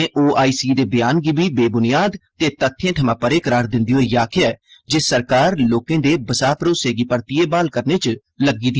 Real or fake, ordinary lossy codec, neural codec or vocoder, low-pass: real; Opus, 16 kbps; none; 7.2 kHz